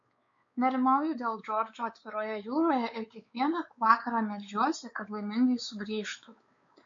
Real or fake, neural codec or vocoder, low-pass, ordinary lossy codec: fake; codec, 16 kHz, 4 kbps, X-Codec, WavLM features, trained on Multilingual LibriSpeech; 7.2 kHz; MP3, 48 kbps